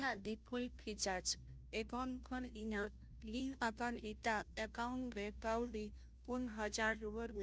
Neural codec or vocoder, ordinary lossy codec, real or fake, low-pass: codec, 16 kHz, 0.5 kbps, FunCodec, trained on Chinese and English, 25 frames a second; none; fake; none